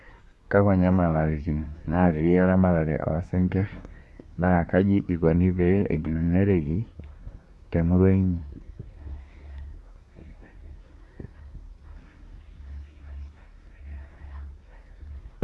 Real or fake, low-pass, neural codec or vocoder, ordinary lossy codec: fake; none; codec, 24 kHz, 1 kbps, SNAC; none